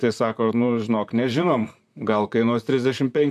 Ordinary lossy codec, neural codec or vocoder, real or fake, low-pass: AAC, 96 kbps; vocoder, 44.1 kHz, 128 mel bands, Pupu-Vocoder; fake; 14.4 kHz